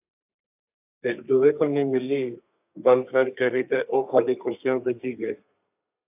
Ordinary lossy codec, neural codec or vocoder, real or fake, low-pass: AAC, 32 kbps; codec, 32 kHz, 1.9 kbps, SNAC; fake; 3.6 kHz